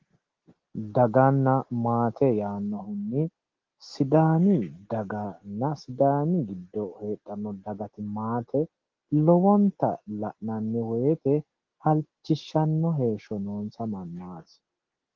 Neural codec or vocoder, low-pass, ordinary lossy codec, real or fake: none; 7.2 kHz; Opus, 16 kbps; real